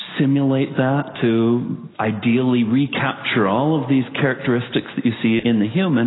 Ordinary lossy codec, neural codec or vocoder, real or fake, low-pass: AAC, 16 kbps; none; real; 7.2 kHz